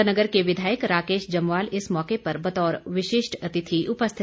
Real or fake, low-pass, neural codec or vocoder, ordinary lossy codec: real; none; none; none